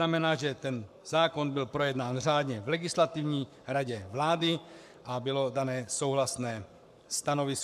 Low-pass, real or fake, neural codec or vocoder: 14.4 kHz; fake; codec, 44.1 kHz, 7.8 kbps, Pupu-Codec